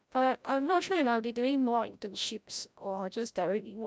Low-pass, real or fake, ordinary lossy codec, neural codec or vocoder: none; fake; none; codec, 16 kHz, 0.5 kbps, FreqCodec, larger model